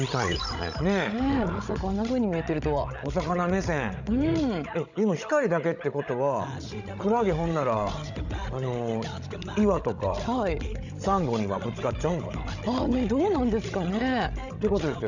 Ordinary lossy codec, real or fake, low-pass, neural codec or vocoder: none; fake; 7.2 kHz; codec, 16 kHz, 16 kbps, FreqCodec, larger model